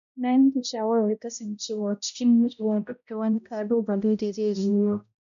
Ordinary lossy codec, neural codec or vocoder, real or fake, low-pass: none; codec, 16 kHz, 0.5 kbps, X-Codec, HuBERT features, trained on balanced general audio; fake; 7.2 kHz